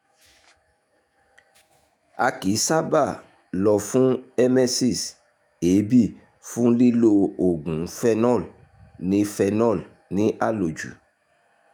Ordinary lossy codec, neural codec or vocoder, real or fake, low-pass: none; autoencoder, 48 kHz, 128 numbers a frame, DAC-VAE, trained on Japanese speech; fake; none